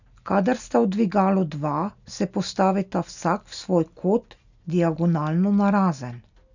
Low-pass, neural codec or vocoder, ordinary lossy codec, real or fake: 7.2 kHz; none; none; real